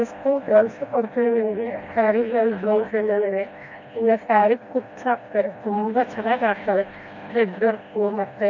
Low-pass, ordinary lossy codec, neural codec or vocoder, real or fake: 7.2 kHz; MP3, 48 kbps; codec, 16 kHz, 1 kbps, FreqCodec, smaller model; fake